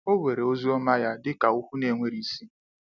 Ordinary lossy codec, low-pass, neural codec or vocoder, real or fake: none; 7.2 kHz; none; real